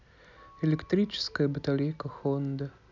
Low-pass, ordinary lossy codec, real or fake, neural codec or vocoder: 7.2 kHz; none; real; none